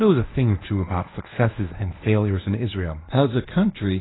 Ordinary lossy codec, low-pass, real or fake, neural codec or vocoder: AAC, 16 kbps; 7.2 kHz; fake; codec, 24 kHz, 0.9 kbps, WavTokenizer, medium speech release version 1